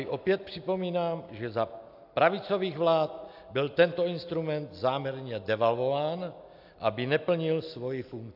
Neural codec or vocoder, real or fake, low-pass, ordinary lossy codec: none; real; 5.4 kHz; AAC, 48 kbps